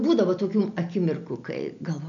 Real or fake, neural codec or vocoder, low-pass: real; none; 7.2 kHz